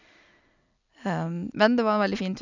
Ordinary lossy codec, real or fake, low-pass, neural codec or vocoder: none; real; 7.2 kHz; none